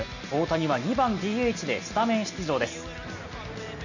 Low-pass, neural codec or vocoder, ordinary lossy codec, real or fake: 7.2 kHz; none; none; real